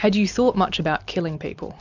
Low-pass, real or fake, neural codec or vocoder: 7.2 kHz; real; none